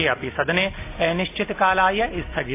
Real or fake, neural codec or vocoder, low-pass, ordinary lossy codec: real; none; 3.6 kHz; AAC, 24 kbps